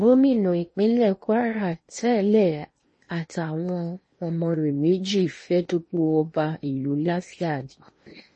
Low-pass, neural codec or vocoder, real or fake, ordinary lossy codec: 10.8 kHz; codec, 16 kHz in and 24 kHz out, 0.8 kbps, FocalCodec, streaming, 65536 codes; fake; MP3, 32 kbps